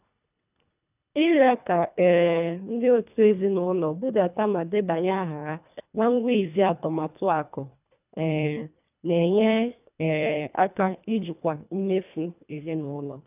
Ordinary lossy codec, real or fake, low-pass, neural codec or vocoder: none; fake; 3.6 kHz; codec, 24 kHz, 1.5 kbps, HILCodec